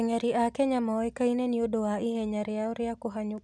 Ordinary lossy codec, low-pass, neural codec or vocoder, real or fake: none; none; none; real